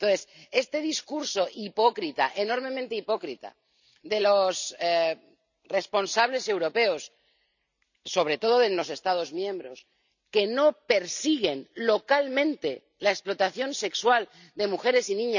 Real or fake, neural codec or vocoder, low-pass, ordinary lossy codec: real; none; 7.2 kHz; none